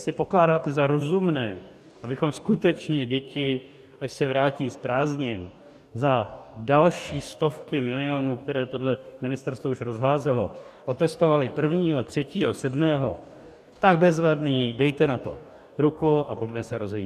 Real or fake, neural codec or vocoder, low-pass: fake; codec, 44.1 kHz, 2.6 kbps, DAC; 14.4 kHz